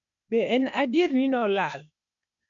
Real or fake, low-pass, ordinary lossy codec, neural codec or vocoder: fake; 7.2 kHz; none; codec, 16 kHz, 0.8 kbps, ZipCodec